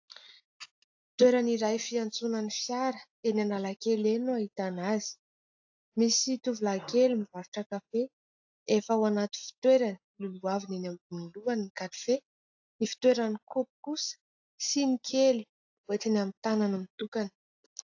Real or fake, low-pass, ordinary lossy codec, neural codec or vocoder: real; 7.2 kHz; AAC, 48 kbps; none